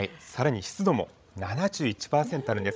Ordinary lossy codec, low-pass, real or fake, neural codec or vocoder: none; none; fake; codec, 16 kHz, 16 kbps, FreqCodec, larger model